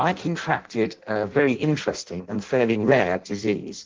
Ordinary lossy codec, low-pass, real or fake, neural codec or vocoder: Opus, 16 kbps; 7.2 kHz; fake; codec, 16 kHz in and 24 kHz out, 0.6 kbps, FireRedTTS-2 codec